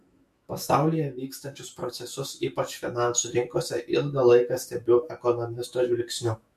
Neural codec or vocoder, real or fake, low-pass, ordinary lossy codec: autoencoder, 48 kHz, 128 numbers a frame, DAC-VAE, trained on Japanese speech; fake; 14.4 kHz; MP3, 64 kbps